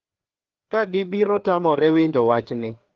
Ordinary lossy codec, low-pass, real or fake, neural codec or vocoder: Opus, 16 kbps; 7.2 kHz; fake; codec, 16 kHz, 2 kbps, FreqCodec, larger model